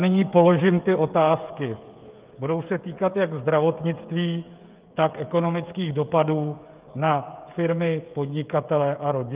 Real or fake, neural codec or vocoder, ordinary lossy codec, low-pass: fake; codec, 16 kHz, 16 kbps, FreqCodec, smaller model; Opus, 32 kbps; 3.6 kHz